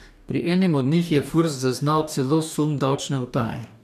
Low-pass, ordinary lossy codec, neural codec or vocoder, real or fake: 14.4 kHz; none; codec, 44.1 kHz, 2.6 kbps, DAC; fake